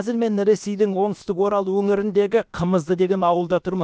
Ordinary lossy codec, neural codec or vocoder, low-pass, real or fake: none; codec, 16 kHz, about 1 kbps, DyCAST, with the encoder's durations; none; fake